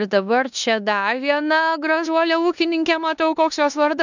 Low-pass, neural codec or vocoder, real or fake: 7.2 kHz; codec, 16 kHz in and 24 kHz out, 0.9 kbps, LongCat-Audio-Codec, four codebook decoder; fake